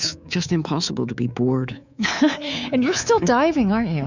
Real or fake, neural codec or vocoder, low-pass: fake; codec, 24 kHz, 3.1 kbps, DualCodec; 7.2 kHz